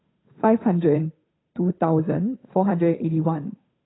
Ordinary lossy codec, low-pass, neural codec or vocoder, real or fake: AAC, 16 kbps; 7.2 kHz; codec, 16 kHz, 8 kbps, FunCodec, trained on Chinese and English, 25 frames a second; fake